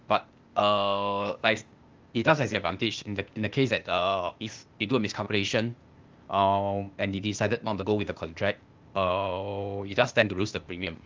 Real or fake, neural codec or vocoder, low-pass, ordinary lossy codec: fake; codec, 16 kHz, 0.8 kbps, ZipCodec; 7.2 kHz; Opus, 32 kbps